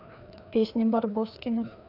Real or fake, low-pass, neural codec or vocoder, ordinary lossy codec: fake; 5.4 kHz; codec, 16 kHz, 2 kbps, FreqCodec, larger model; none